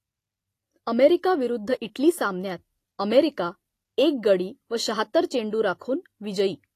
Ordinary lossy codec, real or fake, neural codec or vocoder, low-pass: AAC, 48 kbps; real; none; 14.4 kHz